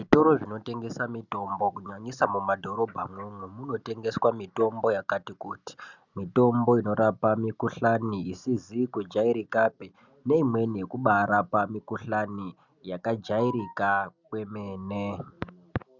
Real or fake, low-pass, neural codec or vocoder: real; 7.2 kHz; none